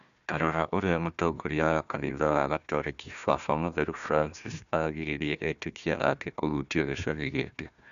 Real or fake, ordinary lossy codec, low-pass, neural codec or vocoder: fake; none; 7.2 kHz; codec, 16 kHz, 1 kbps, FunCodec, trained on Chinese and English, 50 frames a second